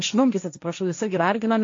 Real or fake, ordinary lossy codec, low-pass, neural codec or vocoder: fake; AAC, 48 kbps; 7.2 kHz; codec, 16 kHz, 1.1 kbps, Voila-Tokenizer